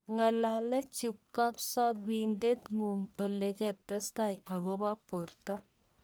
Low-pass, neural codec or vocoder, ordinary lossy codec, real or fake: none; codec, 44.1 kHz, 1.7 kbps, Pupu-Codec; none; fake